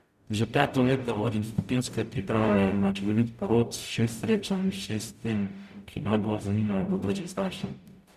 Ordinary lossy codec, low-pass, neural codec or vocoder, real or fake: none; 14.4 kHz; codec, 44.1 kHz, 0.9 kbps, DAC; fake